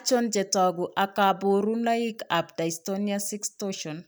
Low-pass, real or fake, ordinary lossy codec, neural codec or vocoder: none; real; none; none